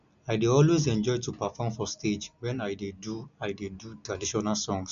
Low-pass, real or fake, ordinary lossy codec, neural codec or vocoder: 7.2 kHz; real; none; none